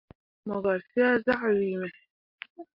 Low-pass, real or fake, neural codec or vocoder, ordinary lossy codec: 5.4 kHz; real; none; MP3, 48 kbps